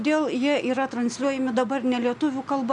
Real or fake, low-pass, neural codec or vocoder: real; 10.8 kHz; none